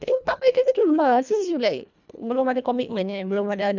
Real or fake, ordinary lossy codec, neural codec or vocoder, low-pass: fake; none; codec, 24 kHz, 1.5 kbps, HILCodec; 7.2 kHz